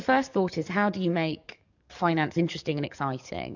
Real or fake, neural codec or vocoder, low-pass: fake; codec, 44.1 kHz, 7.8 kbps, DAC; 7.2 kHz